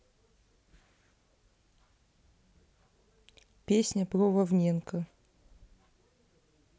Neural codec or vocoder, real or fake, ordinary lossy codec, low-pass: none; real; none; none